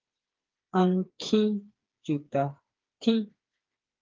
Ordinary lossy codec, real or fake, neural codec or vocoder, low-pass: Opus, 32 kbps; fake; codec, 16 kHz, 4 kbps, FreqCodec, smaller model; 7.2 kHz